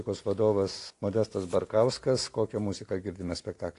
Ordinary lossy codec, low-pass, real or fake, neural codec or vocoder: AAC, 48 kbps; 10.8 kHz; real; none